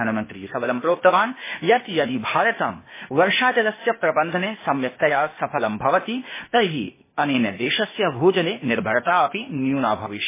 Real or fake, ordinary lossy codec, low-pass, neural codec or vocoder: fake; MP3, 16 kbps; 3.6 kHz; codec, 16 kHz, 0.8 kbps, ZipCodec